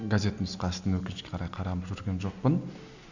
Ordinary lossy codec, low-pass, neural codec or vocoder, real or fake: none; 7.2 kHz; none; real